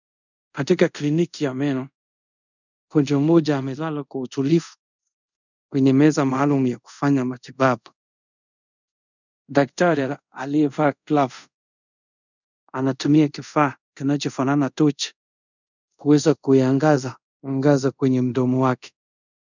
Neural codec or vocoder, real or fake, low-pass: codec, 24 kHz, 0.5 kbps, DualCodec; fake; 7.2 kHz